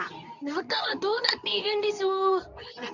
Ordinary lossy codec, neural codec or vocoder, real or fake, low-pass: none; codec, 24 kHz, 0.9 kbps, WavTokenizer, medium speech release version 2; fake; 7.2 kHz